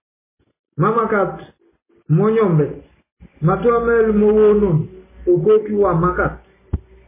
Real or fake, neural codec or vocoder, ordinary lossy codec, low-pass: real; none; MP3, 16 kbps; 3.6 kHz